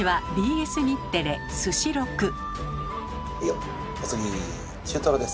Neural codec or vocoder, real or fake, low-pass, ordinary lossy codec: none; real; none; none